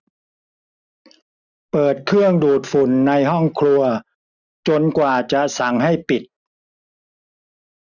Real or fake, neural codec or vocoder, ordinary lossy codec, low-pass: real; none; none; 7.2 kHz